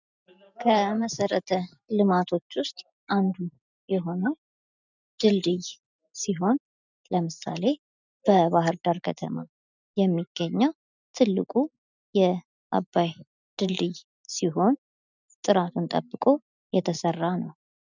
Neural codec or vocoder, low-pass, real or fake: none; 7.2 kHz; real